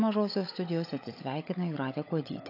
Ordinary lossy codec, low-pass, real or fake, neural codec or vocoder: AAC, 32 kbps; 5.4 kHz; fake; codec, 16 kHz, 16 kbps, FunCodec, trained on LibriTTS, 50 frames a second